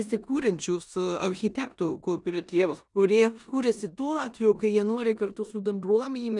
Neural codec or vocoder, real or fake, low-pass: codec, 16 kHz in and 24 kHz out, 0.9 kbps, LongCat-Audio-Codec, four codebook decoder; fake; 10.8 kHz